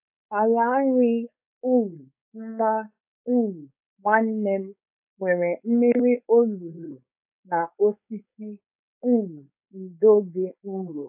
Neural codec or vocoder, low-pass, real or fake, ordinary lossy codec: codec, 16 kHz, 4.8 kbps, FACodec; 3.6 kHz; fake; AAC, 32 kbps